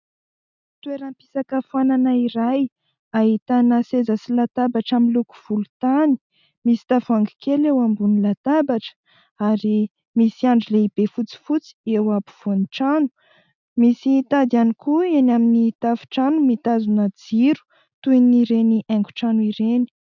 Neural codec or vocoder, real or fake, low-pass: none; real; 7.2 kHz